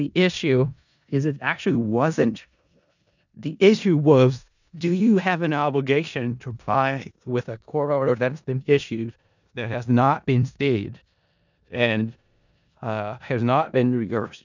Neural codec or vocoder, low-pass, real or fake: codec, 16 kHz in and 24 kHz out, 0.4 kbps, LongCat-Audio-Codec, four codebook decoder; 7.2 kHz; fake